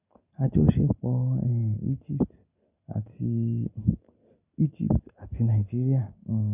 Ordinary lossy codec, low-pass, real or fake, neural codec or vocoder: none; 3.6 kHz; real; none